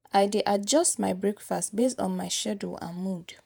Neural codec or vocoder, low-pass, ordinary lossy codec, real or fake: vocoder, 48 kHz, 128 mel bands, Vocos; none; none; fake